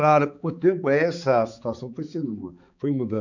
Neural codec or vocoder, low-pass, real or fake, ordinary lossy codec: codec, 16 kHz, 4 kbps, X-Codec, HuBERT features, trained on balanced general audio; 7.2 kHz; fake; none